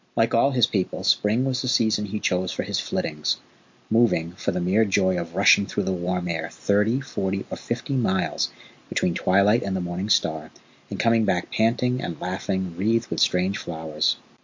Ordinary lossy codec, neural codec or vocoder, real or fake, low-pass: MP3, 48 kbps; none; real; 7.2 kHz